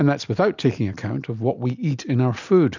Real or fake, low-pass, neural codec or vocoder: real; 7.2 kHz; none